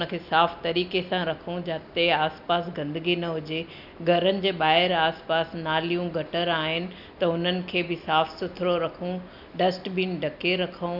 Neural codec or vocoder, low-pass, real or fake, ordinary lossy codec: none; 5.4 kHz; real; none